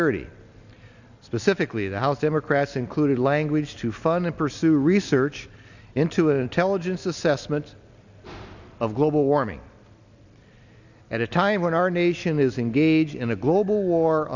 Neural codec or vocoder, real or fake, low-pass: none; real; 7.2 kHz